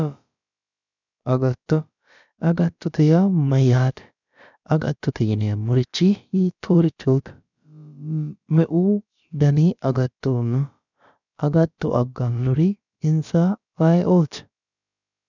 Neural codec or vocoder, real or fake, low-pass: codec, 16 kHz, about 1 kbps, DyCAST, with the encoder's durations; fake; 7.2 kHz